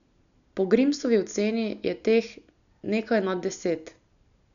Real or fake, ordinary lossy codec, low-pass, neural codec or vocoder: real; none; 7.2 kHz; none